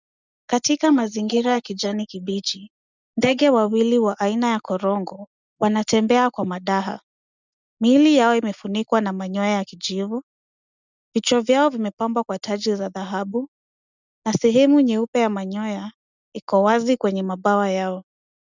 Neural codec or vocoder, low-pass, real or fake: none; 7.2 kHz; real